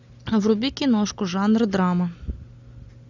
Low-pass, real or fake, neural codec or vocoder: 7.2 kHz; real; none